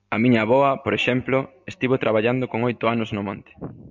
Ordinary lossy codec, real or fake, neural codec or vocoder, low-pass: MP3, 64 kbps; real; none; 7.2 kHz